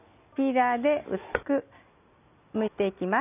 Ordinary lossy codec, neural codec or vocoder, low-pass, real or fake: none; none; 3.6 kHz; real